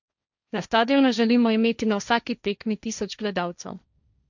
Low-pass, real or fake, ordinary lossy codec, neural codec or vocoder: 7.2 kHz; fake; none; codec, 16 kHz, 1.1 kbps, Voila-Tokenizer